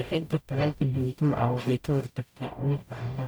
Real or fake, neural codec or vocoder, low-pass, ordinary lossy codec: fake; codec, 44.1 kHz, 0.9 kbps, DAC; none; none